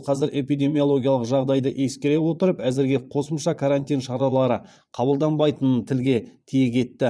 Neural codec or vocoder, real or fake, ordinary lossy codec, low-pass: vocoder, 22.05 kHz, 80 mel bands, Vocos; fake; none; none